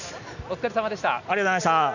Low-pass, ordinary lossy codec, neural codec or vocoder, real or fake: 7.2 kHz; none; none; real